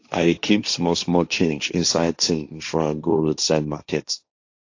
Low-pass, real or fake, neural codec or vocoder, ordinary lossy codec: 7.2 kHz; fake; codec, 16 kHz, 1.1 kbps, Voila-Tokenizer; AAC, 48 kbps